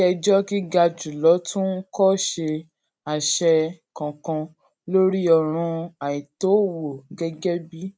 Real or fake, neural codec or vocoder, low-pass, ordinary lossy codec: real; none; none; none